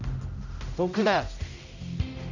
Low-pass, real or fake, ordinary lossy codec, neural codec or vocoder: 7.2 kHz; fake; none; codec, 16 kHz, 0.5 kbps, X-Codec, HuBERT features, trained on general audio